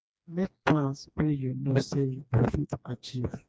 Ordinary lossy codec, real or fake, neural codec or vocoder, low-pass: none; fake; codec, 16 kHz, 2 kbps, FreqCodec, smaller model; none